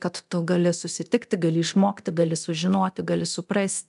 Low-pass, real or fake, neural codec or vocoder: 10.8 kHz; fake; codec, 24 kHz, 0.9 kbps, DualCodec